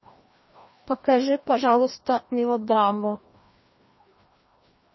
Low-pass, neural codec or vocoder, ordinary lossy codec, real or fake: 7.2 kHz; codec, 16 kHz, 1 kbps, FreqCodec, larger model; MP3, 24 kbps; fake